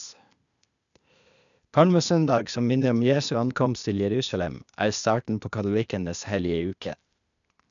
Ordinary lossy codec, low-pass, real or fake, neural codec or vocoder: none; 7.2 kHz; fake; codec, 16 kHz, 0.8 kbps, ZipCodec